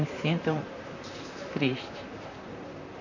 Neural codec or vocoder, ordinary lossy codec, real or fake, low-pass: vocoder, 44.1 kHz, 128 mel bands, Pupu-Vocoder; none; fake; 7.2 kHz